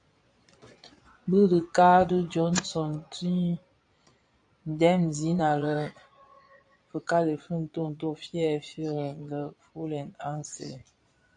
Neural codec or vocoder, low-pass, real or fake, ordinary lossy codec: vocoder, 22.05 kHz, 80 mel bands, Vocos; 9.9 kHz; fake; AAC, 64 kbps